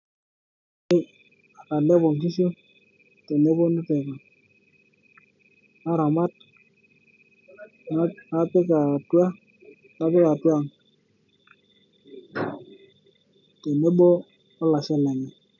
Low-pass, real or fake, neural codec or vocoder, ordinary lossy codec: 7.2 kHz; real; none; none